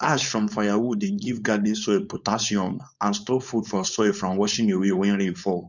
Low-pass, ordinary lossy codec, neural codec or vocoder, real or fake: 7.2 kHz; none; codec, 16 kHz, 4.8 kbps, FACodec; fake